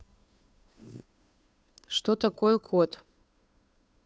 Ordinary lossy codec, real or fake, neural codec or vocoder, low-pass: none; fake; codec, 16 kHz, 2 kbps, FunCodec, trained on Chinese and English, 25 frames a second; none